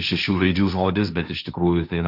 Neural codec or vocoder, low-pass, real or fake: codec, 16 kHz, 1.1 kbps, Voila-Tokenizer; 5.4 kHz; fake